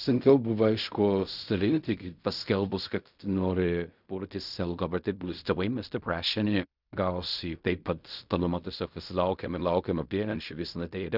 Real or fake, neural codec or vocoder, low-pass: fake; codec, 16 kHz in and 24 kHz out, 0.4 kbps, LongCat-Audio-Codec, fine tuned four codebook decoder; 5.4 kHz